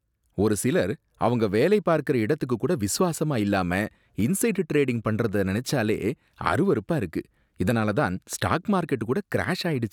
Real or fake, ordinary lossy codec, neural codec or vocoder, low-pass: real; none; none; 19.8 kHz